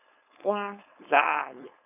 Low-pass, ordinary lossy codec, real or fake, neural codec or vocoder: 3.6 kHz; none; fake; codec, 16 kHz, 4.8 kbps, FACodec